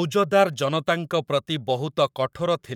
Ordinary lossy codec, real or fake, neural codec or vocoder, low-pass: none; fake; codec, 44.1 kHz, 7.8 kbps, Pupu-Codec; 19.8 kHz